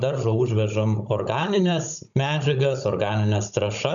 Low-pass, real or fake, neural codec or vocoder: 7.2 kHz; fake; codec, 16 kHz, 16 kbps, FunCodec, trained on Chinese and English, 50 frames a second